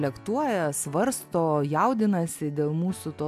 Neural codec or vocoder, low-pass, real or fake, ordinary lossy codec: none; 14.4 kHz; real; AAC, 96 kbps